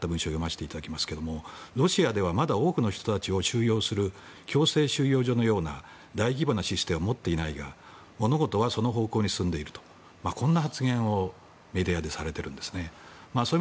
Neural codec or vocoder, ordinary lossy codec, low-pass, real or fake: none; none; none; real